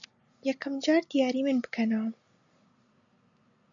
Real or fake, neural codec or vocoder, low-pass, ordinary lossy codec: real; none; 7.2 kHz; MP3, 96 kbps